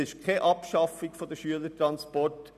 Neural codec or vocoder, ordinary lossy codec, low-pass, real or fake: none; none; 14.4 kHz; real